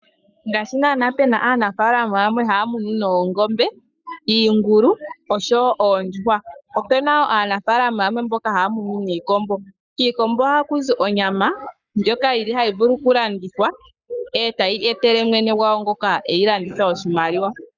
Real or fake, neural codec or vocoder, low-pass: fake; codec, 44.1 kHz, 7.8 kbps, Pupu-Codec; 7.2 kHz